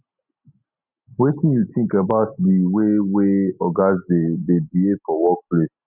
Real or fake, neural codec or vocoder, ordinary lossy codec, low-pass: real; none; none; 3.6 kHz